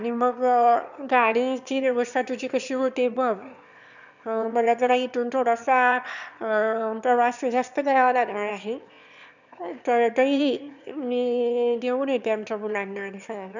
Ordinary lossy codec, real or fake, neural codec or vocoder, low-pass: none; fake; autoencoder, 22.05 kHz, a latent of 192 numbers a frame, VITS, trained on one speaker; 7.2 kHz